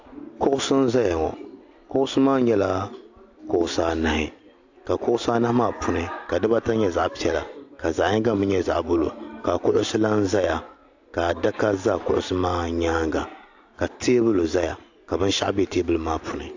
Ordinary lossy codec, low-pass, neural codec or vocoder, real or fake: AAC, 48 kbps; 7.2 kHz; none; real